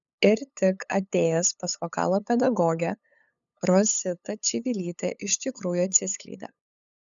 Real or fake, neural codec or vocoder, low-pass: fake; codec, 16 kHz, 8 kbps, FunCodec, trained on LibriTTS, 25 frames a second; 7.2 kHz